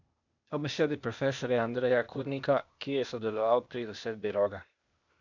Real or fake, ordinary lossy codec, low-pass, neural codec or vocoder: fake; none; 7.2 kHz; codec, 16 kHz, 0.8 kbps, ZipCodec